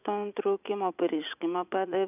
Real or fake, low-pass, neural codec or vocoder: fake; 3.6 kHz; vocoder, 44.1 kHz, 80 mel bands, Vocos